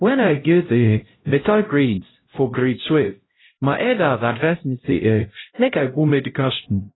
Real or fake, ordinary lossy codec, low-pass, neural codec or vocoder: fake; AAC, 16 kbps; 7.2 kHz; codec, 16 kHz, 0.5 kbps, X-Codec, HuBERT features, trained on LibriSpeech